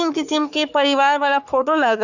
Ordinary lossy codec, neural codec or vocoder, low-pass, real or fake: Opus, 64 kbps; codec, 44.1 kHz, 3.4 kbps, Pupu-Codec; 7.2 kHz; fake